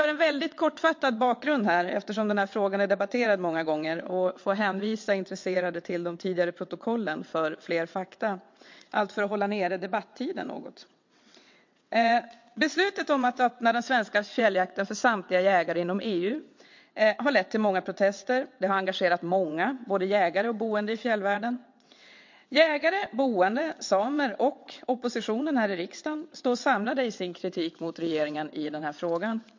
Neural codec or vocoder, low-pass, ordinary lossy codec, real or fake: vocoder, 22.05 kHz, 80 mel bands, WaveNeXt; 7.2 kHz; MP3, 48 kbps; fake